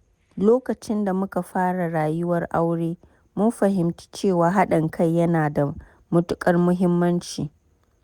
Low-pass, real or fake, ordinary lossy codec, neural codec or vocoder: 19.8 kHz; real; Opus, 64 kbps; none